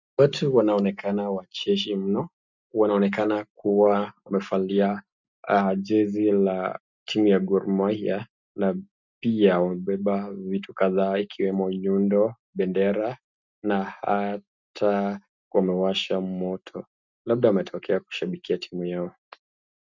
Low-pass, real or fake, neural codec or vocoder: 7.2 kHz; real; none